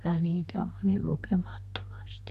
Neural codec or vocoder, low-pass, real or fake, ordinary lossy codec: codec, 32 kHz, 1.9 kbps, SNAC; 14.4 kHz; fake; none